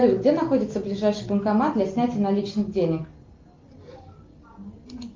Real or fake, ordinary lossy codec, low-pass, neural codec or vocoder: real; Opus, 24 kbps; 7.2 kHz; none